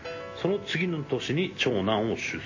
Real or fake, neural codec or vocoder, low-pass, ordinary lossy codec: real; none; 7.2 kHz; MP3, 32 kbps